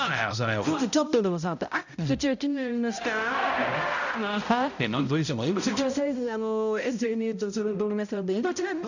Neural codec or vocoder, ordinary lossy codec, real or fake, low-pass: codec, 16 kHz, 0.5 kbps, X-Codec, HuBERT features, trained on balanced general audio; none; fake; 7.2 kHz